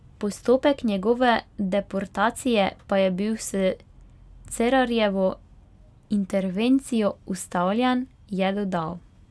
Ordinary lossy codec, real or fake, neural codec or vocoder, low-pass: none; real; none; none